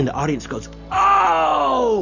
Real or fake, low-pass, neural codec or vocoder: real; 7.2 kHz; none